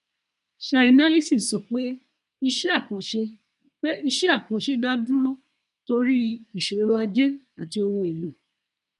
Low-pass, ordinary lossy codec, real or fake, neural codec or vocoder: 10.8 kHz; none; fake; codec, 24 kHz, 1 kbps, SNAC